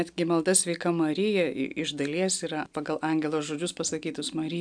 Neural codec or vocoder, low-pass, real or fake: none; 9.9 kHz; real